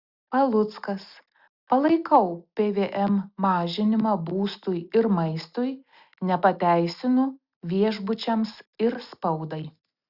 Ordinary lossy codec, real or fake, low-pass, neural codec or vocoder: Opus, 64 kbps; real; 5.4 kHz; none